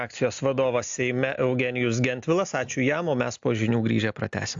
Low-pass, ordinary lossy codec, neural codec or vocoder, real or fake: 7.2 kHz; AAC, 64 kbps; none; real